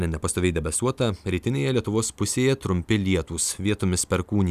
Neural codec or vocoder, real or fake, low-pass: none; real; 14.4 kHz